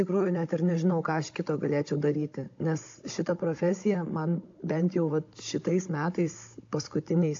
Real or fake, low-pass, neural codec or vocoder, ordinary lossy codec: fake; 7.2 kHz; codec, 16 kHz, 16 kbps, FunCodec, trained on Chinese and English, 50 frames a second; AAC, 48 kbps